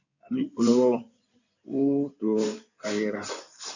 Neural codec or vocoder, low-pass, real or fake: codec, 16 kHz in and 24 kHz out, 2.2 kbps, FireRedTTS-2 codec; 7.2 kHz; fake